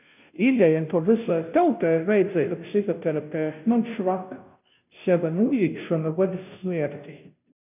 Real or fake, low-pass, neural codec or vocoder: fake; 3.6 kHz; codec, 16 kHz, 0.5 kbps, FunCodec, trained on Chinese and English, 25 frames a second